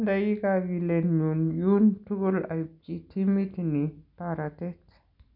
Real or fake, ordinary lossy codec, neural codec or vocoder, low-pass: real; none; none; 5.4 kHz